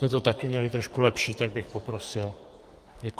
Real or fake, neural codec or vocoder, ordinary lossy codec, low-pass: fake; codec, 44.1 kHz, 2.6 kbps, SNAC; Opus, 32 kbps; 14.4 kHz